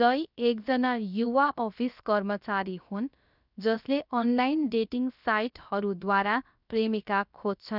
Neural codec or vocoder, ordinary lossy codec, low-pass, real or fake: codec, 16 kHz, about 1 kbps, DyCAST, with the encoder's durations; none; 5.4 kHz; fake